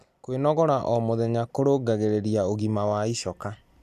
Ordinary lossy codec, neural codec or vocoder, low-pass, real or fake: none; none; 14.4 kHz; real